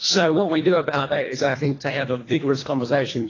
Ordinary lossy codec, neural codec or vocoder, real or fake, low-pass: AAC, 32 kbps; codec, 24 kHz, 1.5 kbps, HILCodec; fake; 7.2 kHz